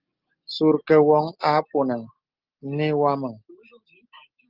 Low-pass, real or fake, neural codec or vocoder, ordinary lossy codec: 5.4 kHz; real; none; Opus, 32 kbps